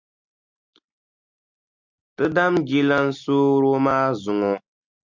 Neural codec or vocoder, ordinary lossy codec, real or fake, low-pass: none; MP3, 64 kbps; real; 7.2 kHz